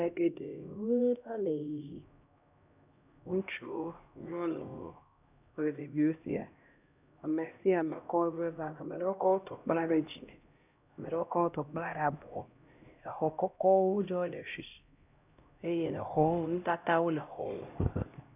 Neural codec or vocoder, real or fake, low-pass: codec, 16 kHz, 1 kbps, X-Codec, HuBERT features, trained on LibriSpeech; fake; 3.6 kHz